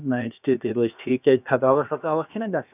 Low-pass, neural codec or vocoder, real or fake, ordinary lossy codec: 3.6 kHz; codec, 16 kHz, about 1 kbps, DyCAST, with the encoder's durations; fake; none